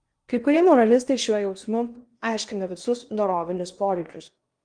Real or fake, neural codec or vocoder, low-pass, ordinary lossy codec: fake; codec, 16 kHz in and 24 kHz out, 0.8 kbps, FocalCodec, streaming, 65536 codes; 9.9 kHz; Opus, 32 kbps